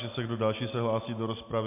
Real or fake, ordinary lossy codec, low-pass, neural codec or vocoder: real; MP3, 32 kbps; 3.6 kHz; none